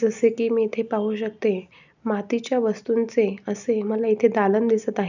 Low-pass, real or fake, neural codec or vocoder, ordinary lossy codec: 7.2 kHz; real; none; none